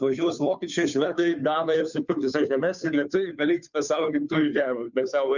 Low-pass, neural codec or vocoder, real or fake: 7.2 kHz; codec, 16 kHz, 2 kbps, FunCodec, trained on Chinese and English, 25 frames a second; fake